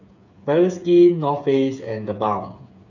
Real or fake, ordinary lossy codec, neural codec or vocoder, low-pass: fake; none; codec, 16 kHz, 8 kbps, FreqCodec, smaller model; 7.2 kHz